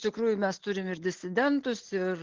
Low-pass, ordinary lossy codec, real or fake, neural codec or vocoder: 7.2 kHz; Opus, 16 kbps; real; none